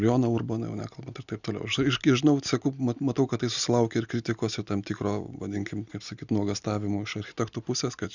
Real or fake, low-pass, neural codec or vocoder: real; 7.2 kHz; none